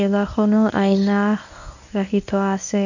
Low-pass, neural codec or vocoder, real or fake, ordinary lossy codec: 7.2 kHz; codec, 24 kHz, 0.9 kbps, WavTokenizer, medium speech release version 1; fake; none